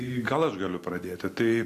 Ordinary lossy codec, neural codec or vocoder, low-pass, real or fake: AAC, 64 kbps; none; 14.4 kHz; real